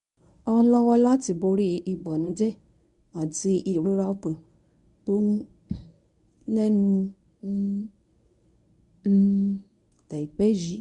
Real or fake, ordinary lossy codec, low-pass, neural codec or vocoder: fake; MP3, 64 kbps; 10.8 kHz; codec, 24 kHz, 0.9 kbps, WavTokenizer, medium speech release version 1